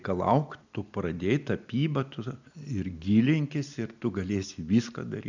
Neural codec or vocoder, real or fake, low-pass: none; real; 7.2 kHz